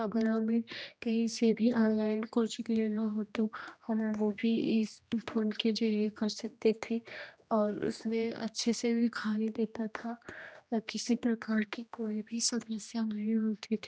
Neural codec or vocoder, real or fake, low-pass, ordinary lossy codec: codec, 16 kHz, 1 kbps, X-Codec, HuBERT features, trained on general audio; fake; none; none